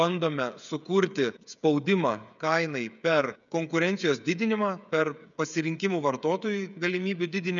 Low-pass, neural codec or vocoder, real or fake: 7.2 kHz; codec, 16 kHz, 8 kbps, FreqCodec, smaller model; fake